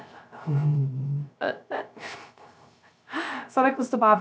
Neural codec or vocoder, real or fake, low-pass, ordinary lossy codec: codec, 16 kHz, 0.3 kbps, FocalCodec; fake; none; none